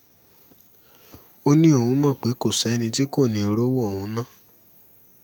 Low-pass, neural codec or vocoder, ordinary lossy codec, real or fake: 19.8 kHz; codec, 44.1 kHz, 7.8 kbps, DAC; none; fake